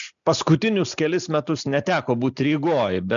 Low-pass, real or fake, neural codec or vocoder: 7.2 kHz; real; none